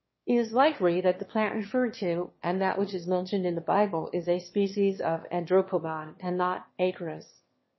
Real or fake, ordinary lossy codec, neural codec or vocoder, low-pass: fake; MP3, 24 kbps; autoencoder, 22.05 kHz, a latent of 192 numbers a frame, VITS, trained on one speaker; 7.2 kHz